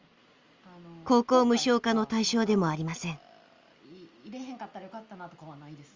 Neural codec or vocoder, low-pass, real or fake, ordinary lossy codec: none; 7.2 kHz; real; Opus, 32 kbps